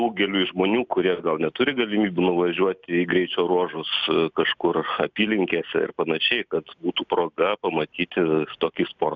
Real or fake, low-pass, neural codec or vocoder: real; 7.2 kHz; none